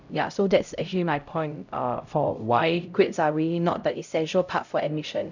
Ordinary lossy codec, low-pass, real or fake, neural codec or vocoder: none; 7.2 kHz; fake; codec, 16 kHz, 0.5 kbps, X-Codec, HuBERT features, trained on LibriSpeech